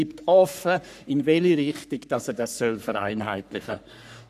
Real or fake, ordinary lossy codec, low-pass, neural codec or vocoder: fake; none; 14.4 kHz; codec, 44.1 kHz, 3.4 kbps, Pupu-Codec